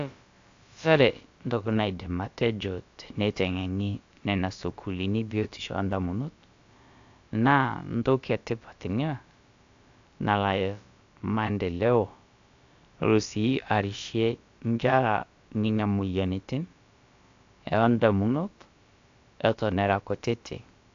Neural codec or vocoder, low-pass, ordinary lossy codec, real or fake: codec, 16 kHz, about 1 kbps, DyCAST, with the encoder's durations; 7.2 kHz; AAC, 64 kbps; fake